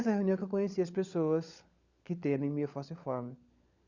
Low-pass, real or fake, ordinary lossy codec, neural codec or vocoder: 7.2 kHz; fake; Opus, 64 kbps; codec, 16 kHz, 16 kbps, FunCodec, trained on LibriTTS, 50 frames a second